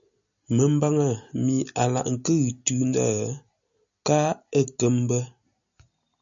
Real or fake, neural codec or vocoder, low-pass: real; none; 7.2 kHz